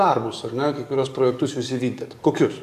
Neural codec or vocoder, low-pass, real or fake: codec, 44.1 kHz, 7.8 kbps, DAC; 14.4 kHz; fake